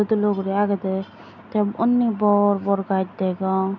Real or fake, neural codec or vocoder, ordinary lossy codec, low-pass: real; none; none; 7.2 kHz